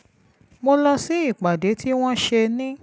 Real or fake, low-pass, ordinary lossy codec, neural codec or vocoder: real; none; none; none